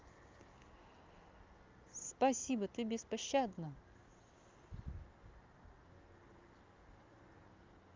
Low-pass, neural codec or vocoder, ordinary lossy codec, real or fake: 7.2 kHz; none; Opus, 24 kbps; real